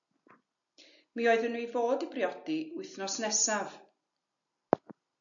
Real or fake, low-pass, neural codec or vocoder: real; 7.2 kHz; none